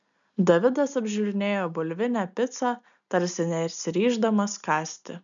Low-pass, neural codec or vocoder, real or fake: 7.2 kHz; none; real